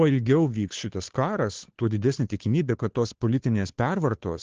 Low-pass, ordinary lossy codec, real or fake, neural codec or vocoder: 7.2 kHz; Opus, 16 kbps; fake; codec, 16 kHz, 2 kbps, FunCodec, trained on Chinese and English, 25 frames a second